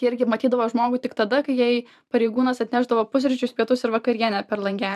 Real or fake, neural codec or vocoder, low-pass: fake; vocoder, 44.1 kHz, 128 mel bands every 512 samples, BigVGAN v2; 14.4 kHz